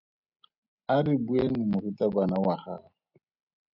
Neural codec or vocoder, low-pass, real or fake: none; 5.4 kHz; real